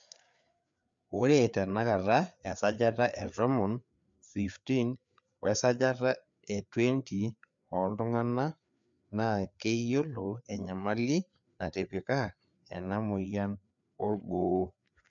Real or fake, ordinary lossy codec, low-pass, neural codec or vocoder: fake; MP3, 64 kbps; 7.2 kHz; codec, 16 kHz, 4 kbps, FreqCodec, larger model